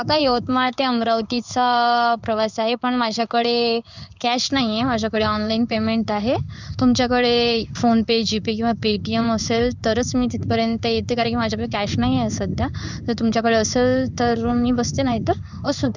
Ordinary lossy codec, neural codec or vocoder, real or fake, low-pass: none; codec, 16 kHz in and 24 kHz out, 1 kbps, XY-Tokenizer; fake; 7.2 kHz